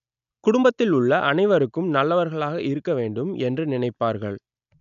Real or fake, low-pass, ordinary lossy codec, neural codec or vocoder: real; 7.2 kHz; none; none